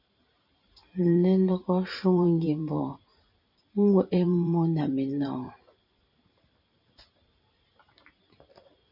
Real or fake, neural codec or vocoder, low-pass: fake; vocoder, 44.1 kHz, 128 mel bands every 512 samples, BigVGAN v2; 5.4 kHz